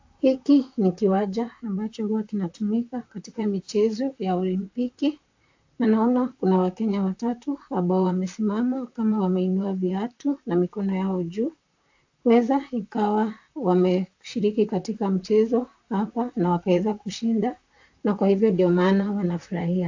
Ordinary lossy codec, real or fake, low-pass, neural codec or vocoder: AAC, 48 kbps; fake; 7.2 kHz; vocoder, 44.1 kHz, 128 mel bands, Pupu-Vocoder